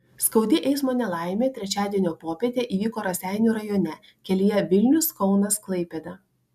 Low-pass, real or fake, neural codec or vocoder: 14.4 kHz; real; none